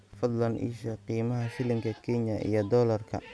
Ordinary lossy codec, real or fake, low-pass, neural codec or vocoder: none; real; none; none